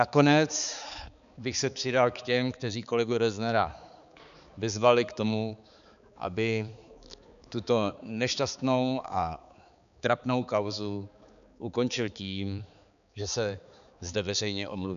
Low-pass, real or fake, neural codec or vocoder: 7.2 kHz; fake; codec, 16 kHz, 4 kbps, X-Codec, HuBERT features, trained on balanced general audio